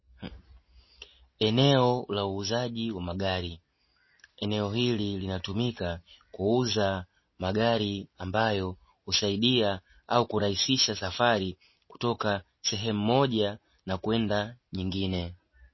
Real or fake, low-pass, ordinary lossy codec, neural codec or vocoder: real; 7.2 kHz; MP3, 24 kbps; none